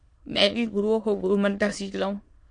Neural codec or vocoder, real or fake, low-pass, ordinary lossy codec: autoencoder, 22.05 kHz, a latent of 192 numbers a frame, VITS, trained on many speakers; fake; 9.9 kHz; MP3, 48 kbps